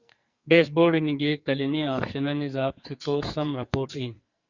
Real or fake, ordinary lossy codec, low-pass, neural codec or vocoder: fake; Opus, 64 kbps; 7.2 kHz; codec, 44.1 kHz, 2.6 kbps, SNAC